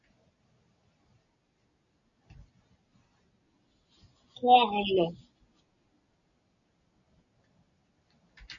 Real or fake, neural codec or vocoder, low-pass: real; none; 7.2 kHz